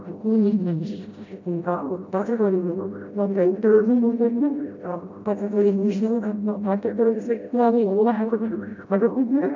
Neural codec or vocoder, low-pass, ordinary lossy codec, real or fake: codec, 16 kHz, 0.5 kbps, FreqCodec, smaller model; 7.2 kHz; MP3, 64 kbps; fake